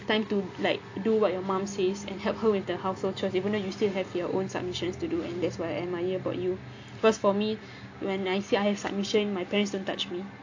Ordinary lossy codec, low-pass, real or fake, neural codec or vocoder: AAC, 48 kbps; 7.2 kHz; real; none